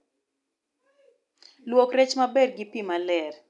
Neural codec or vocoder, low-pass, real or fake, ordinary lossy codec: none; 10.8 kHz; real; none